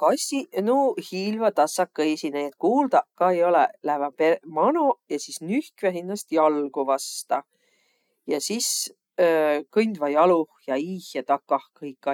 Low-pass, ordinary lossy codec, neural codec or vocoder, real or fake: 19.8 kHz; none; none; real